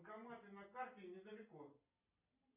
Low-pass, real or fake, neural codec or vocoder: 3.6 kHz; real; none